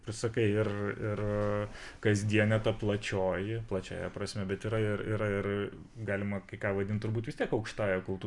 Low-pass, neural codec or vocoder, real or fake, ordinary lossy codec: 10.8 kHz; none; real; MP3, 96 kbps